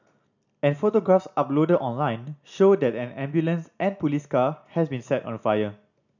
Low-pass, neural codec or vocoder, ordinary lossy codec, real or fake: 7.2 kHz; none; none; real